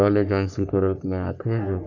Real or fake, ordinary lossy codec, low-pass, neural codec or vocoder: fake; none; 7.2 kHz; codec, 44.1 kHz, 3.4 kbps, Pupu-Codec